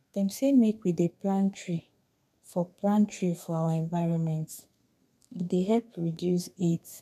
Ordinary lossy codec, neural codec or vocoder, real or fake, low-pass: none; codec, 32 kHz, 1.9 kbps, SNAC; fake; 14.4 kHz